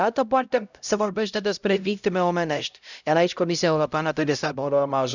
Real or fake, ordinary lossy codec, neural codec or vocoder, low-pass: fake; none; codec, 16 kHz, 0.5 kbps, X-Codec, HuBERT features, trained on LibriSpeech; 7.2 kHz